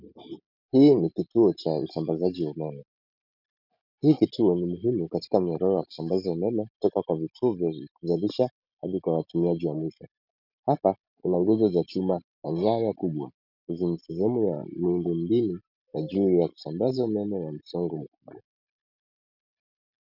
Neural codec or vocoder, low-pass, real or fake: none; 5.4 kHz; real